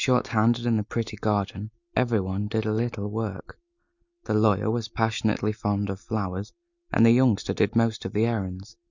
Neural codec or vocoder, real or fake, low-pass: none; real; 7.2 kHz